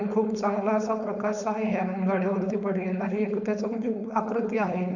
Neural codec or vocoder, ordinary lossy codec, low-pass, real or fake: codec, 16 kHz, 4.8 kbps, FACodec; none; 7.2 kHz; fake